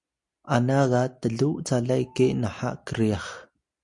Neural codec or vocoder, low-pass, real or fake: none; 10.8 kHz; real